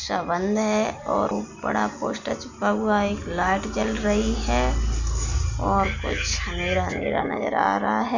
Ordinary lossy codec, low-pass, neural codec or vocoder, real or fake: none; 7.2 kHz; none; real